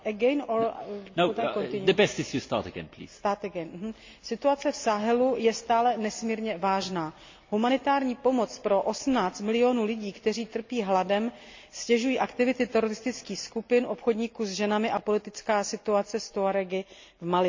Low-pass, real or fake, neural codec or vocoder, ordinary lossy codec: 7.2 kHz; real; none; MP3, 48 kbps